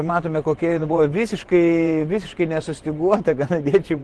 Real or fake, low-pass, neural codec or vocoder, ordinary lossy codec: fake; 10.8 kHz; vocoder, 24 kHz, 100 mel bands, Vocos; Opus, 16 kbps